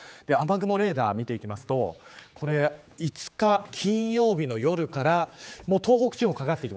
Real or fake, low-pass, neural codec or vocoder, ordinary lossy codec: fake; none; codec, 16 kHz, 4 kbps, X-Codec, HuBERT features, trained on general audio; none